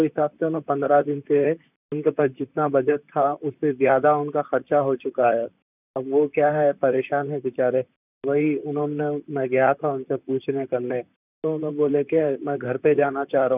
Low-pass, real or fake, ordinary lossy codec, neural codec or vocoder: 3.6 kHz; fake; none; vocoder, 44.1 kHz, 128 mel bands, Pupu-Vocoder